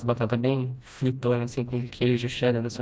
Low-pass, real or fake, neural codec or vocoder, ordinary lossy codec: none; fake; codec, 16 kHz, 1 kbps, FreqCodec, smaller model; none